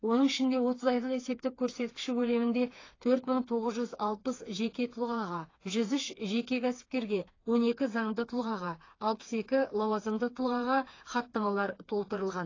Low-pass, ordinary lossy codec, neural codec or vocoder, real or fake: 7.2 kHz; AAC, 32 kbps; codec, 16 kHz, 4 kbps, FreqCodec, smaller model; fake